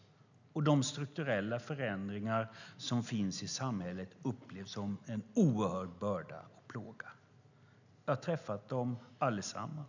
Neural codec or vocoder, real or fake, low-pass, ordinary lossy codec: none; real; 7.2 kHz; none